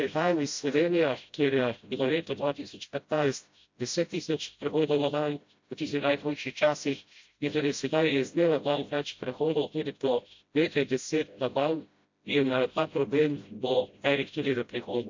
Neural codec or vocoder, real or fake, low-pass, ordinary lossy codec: codec, 16 kHz, 0.5 kbps, FreqCodec, smaller model; fake; 7.2 kHz; MP3, 48 kbps